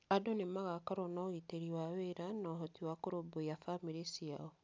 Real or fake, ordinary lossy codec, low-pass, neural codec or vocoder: real; none; none; none